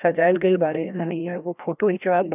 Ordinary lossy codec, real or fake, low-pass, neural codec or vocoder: none; fake; 3.6 kHz; codec, 16 kHz, 1 kbps, FreqCodec, larger model